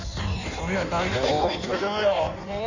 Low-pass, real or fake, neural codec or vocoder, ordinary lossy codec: 7.2 kHz; fake; codec, 16 kHz in and 24 kHz out, 1.1 kbps, FireRedTTS-2 codec; none